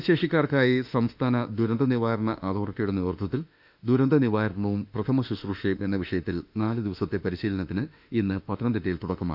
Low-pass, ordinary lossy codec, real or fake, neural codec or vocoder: 5.4 kHz; none; fake; autoencoder, 48 kHz, 32 numbers a frame, DAC-VAE, trained on Japanese speech